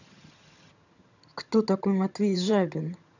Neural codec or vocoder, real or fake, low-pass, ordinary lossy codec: vocoder, 22.05 kHz, 80 mel bands, HiFi-GAN; fake; 7.2 kHz; none